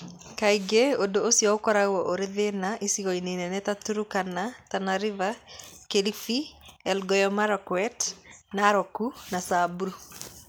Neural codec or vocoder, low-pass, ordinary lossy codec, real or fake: none; none; none; real